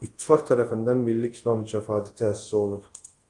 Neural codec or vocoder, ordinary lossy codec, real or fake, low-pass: codec, 24 kHz, 0.5 kbps, DualCodec; Opus, 24 kbps; fake; 10.8 kHz